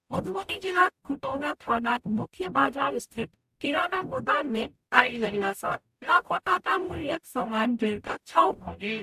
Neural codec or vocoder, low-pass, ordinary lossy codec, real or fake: codec, 44.1 kHz, 0.9 kbps, DAC; 14.4 kHz; AAC, 96 kbps; fake